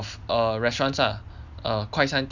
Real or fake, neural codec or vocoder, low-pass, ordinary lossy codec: real; none; 7.2 kHz; none